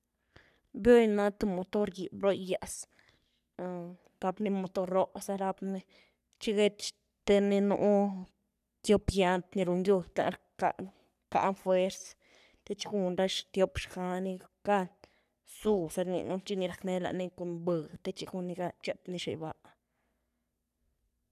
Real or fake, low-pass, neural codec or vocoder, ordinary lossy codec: fake; 14.4 kHz; codec, 44.1 kHz, 3.4 kbps, Pupu-Codec; none